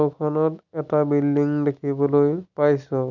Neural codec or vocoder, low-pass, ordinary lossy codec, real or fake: none; 7.2 kHz; none; real